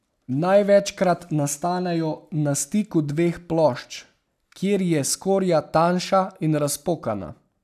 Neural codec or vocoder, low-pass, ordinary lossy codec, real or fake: none; 14.4 kHz; none; real